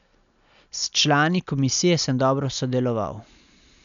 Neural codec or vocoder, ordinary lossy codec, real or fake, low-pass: none; none; real; 7.2 kHz